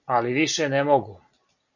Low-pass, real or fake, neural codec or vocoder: 7.2 kHz; real; none